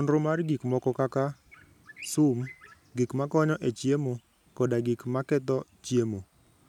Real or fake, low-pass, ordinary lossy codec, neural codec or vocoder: real; 19.8 kHz; none; none